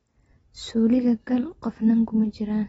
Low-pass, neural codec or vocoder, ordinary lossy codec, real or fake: 14.4 kHz; none; AAC, 24 kbps; real